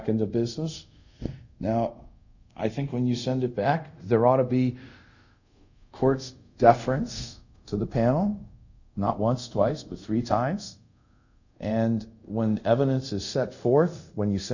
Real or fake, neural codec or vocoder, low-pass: fake; codec, 24 kHz, 0.5 kbps, DualCodec; 7.2 kHz